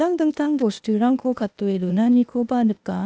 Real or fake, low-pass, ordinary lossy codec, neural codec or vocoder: fake; none; none; codec, 16 kHz, 0.8 kbps, ZipCodec